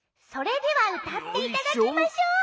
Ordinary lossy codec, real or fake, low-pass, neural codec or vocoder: none; real; none; none